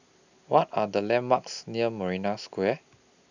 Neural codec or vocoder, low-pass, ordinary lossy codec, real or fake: none; 7.2 kHz; none; real